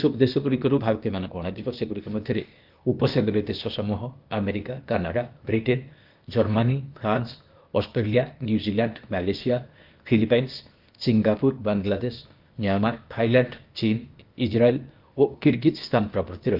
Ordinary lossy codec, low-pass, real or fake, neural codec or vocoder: Opus, 24 kbps; 5.4 kHz; fake; codec, 16 kHz, 0.8 kbps, ZipCodec